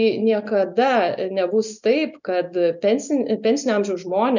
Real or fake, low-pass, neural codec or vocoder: real; 7.2 kHz; none